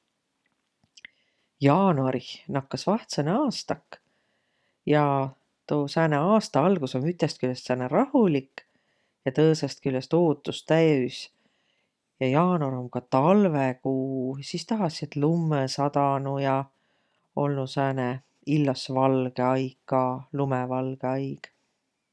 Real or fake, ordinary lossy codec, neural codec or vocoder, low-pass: real; none; none; none